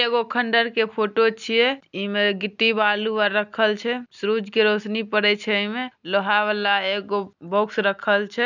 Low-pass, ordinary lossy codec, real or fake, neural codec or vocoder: 7.2 kHz; none; real; none